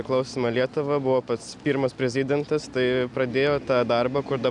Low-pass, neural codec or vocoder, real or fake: 10.8 kHz; none; real